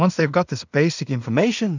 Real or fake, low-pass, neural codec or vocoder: fake; 7.2 kHz; codec, 16 kHz in and 24 kHz out, 0.4 kbps, LongCat-Audio-Codec, two codebook decoder